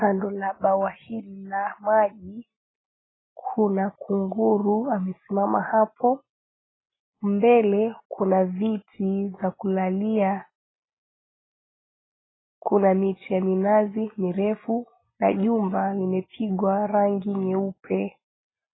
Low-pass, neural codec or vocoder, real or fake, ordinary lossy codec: 7.2 kHz; none; real; AAC, 16 kbps